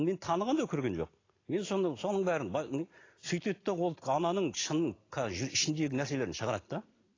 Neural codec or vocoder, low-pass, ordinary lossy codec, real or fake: none; 7.2 kHz; AAC, 32 kbps; real